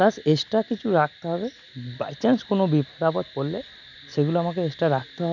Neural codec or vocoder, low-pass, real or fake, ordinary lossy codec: none; 7.2 kHz; real; none